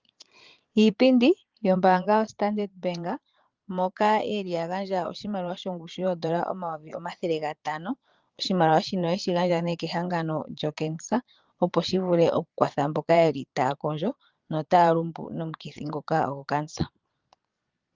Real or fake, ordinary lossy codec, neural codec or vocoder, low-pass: fake; Opus, 32 kbps; vocoder, 22.05 kHz, 80 mel bands, Vocos; 7.2 kHz